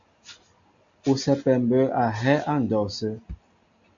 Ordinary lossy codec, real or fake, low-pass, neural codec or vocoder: AAC, 64 kbps; real; 7.2 kHz; none